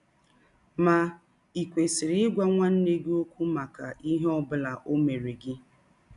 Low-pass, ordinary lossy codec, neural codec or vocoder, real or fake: 10.8 kHz; none; none; real